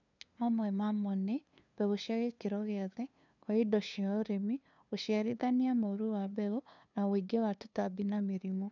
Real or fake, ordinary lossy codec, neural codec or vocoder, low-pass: fake; none; codec, 16 kHz, 2 kbps, FunCodec, trained on LibriTTS, 25 frames a second; 7.2 kHz